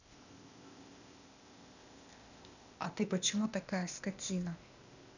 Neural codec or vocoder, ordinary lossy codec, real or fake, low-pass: codec, 16 kHz, 0.8 kbps, ZipCodec; none; fake; 7.2 kHz